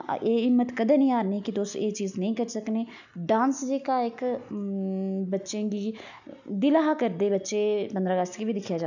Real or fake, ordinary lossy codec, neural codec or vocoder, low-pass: real; none; none; 7.2 kHz